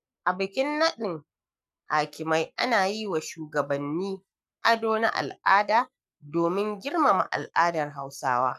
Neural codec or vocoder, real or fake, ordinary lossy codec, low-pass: codec, 44.1 kHz, 7.8 kbps, Pupu-Codec; fake; none; 14.4 kHz